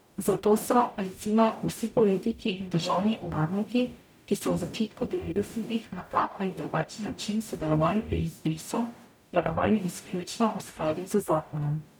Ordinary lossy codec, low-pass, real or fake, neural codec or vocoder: none; none; fake; codec, 44.1 kHz, 0.9 kbps, DAC